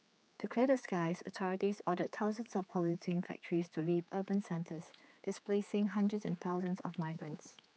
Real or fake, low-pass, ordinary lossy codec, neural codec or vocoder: fake; none; none; codec, 16 kHz, 4 kbps, X-Codec, HuBERT features, trained on general audio